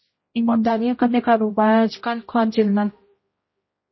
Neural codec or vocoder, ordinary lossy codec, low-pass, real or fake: codec, 16 kHz, 0.5 kbps, X-Codec, HuBERT features, trained on general audio; MP3, 24 kbps; 7.2 kHz; fake